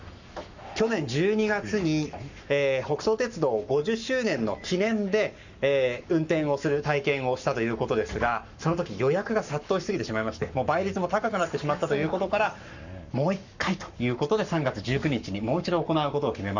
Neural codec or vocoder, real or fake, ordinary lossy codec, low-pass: codec, 44.1 kHz, 7.8 kbps, Pupu-Codec; fake; none; 7.2 kHz